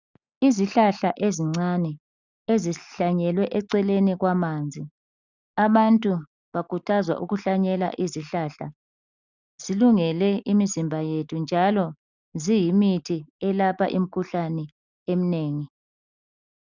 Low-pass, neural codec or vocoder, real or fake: 7.2 kHz; none; real